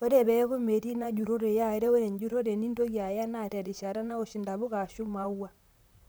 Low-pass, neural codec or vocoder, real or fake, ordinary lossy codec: none; vocoder, 44.1 kHz, 128 mel bands, Pupu-Vocoder; fake; none